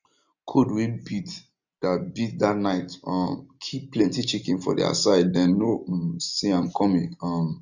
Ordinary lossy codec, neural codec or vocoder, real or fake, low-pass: none; none; real; 7.2 kHz